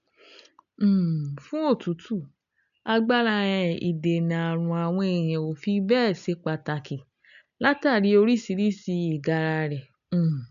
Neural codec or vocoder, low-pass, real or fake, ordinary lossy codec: none; 7.2 kHz; real; none